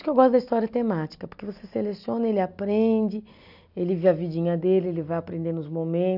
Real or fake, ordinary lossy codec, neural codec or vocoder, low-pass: real; none; none; 5.4 kHz